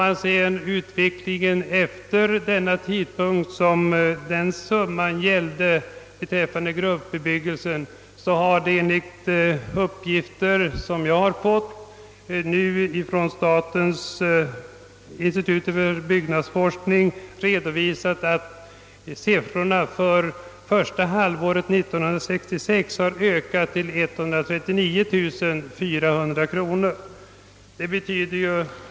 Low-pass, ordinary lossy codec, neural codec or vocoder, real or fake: none; none; none; real